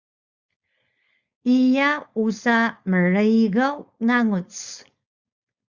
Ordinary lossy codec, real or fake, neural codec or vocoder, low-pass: Opus, 64 kbps; fake; codec, 16 kHz, 4.8 kbps, FACodec; 7.2 kHz